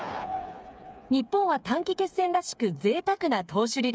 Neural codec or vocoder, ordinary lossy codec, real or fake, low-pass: codec, 16 kHz, 4 kbps, FreqCodec, smaller model; none; fake; none